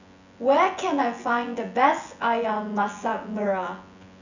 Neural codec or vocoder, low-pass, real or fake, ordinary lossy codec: vocoder, 24 kHz, 100 mel bands, Vocos; 7.2 kHz; fake; none